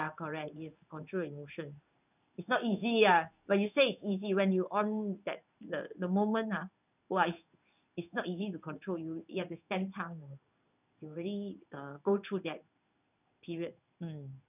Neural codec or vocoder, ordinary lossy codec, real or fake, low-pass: codec, 16 kHz in and 24 kHz out, 1 kbps, XY-Tokenizer; none; fake; 3.6 kHz